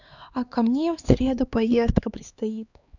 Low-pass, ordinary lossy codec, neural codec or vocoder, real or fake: 7.2 kHz; none; codec, 16 kHz, 2 kbps, X-Codec, HuBERT features, trained on LibriSpeech; fake